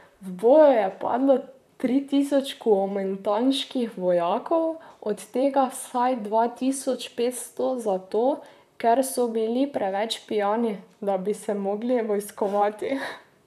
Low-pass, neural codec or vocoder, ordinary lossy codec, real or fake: 14.4 kHz; vocoder, 44.1 kHz, 128 mel bands, Pupu-Vocoder; none; fake